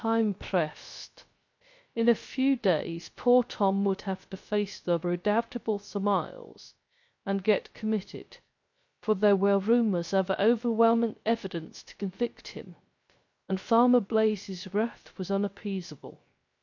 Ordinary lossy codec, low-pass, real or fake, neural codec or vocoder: MP3, 48 kbps; 7.2 kHz; fake; codec, 16 kHz, 0.3 kbps, FocalCodec